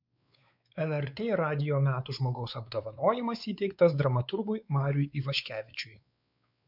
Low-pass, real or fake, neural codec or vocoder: 5.4 kHz; fake; codec, 16 kHz, 4 kbps, X-Codec, WavLM features, trained on Multilingual LibriSpeech